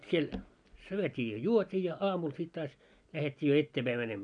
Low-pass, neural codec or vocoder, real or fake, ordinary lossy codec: 9.9 kHz; none; real; none